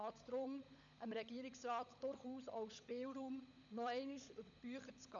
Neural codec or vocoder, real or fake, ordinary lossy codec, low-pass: codec, 16 kHz, 16 kbps, FunCodec, trained on LibriTTS, 50 frames a second; fake; none; 7.2 kHz